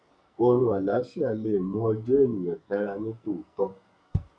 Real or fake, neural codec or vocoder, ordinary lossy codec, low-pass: fake; codec, 44.1 kHz, 2.6 kbps, SNAC; none; 9.9 kHz